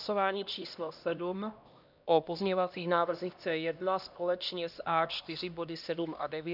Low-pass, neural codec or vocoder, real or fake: 5.4 kHz; codec, 16 kHz, 1 kbps, X-Codec, HuBERT features, trained on LibriSpeech; fake